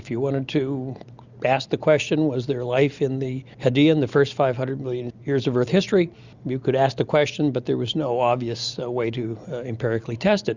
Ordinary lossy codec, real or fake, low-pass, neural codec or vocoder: Opus, 64 kbps; real; 7.2 kHz; none